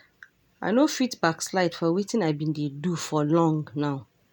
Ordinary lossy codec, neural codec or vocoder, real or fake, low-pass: none; none; real; 19.8 kHz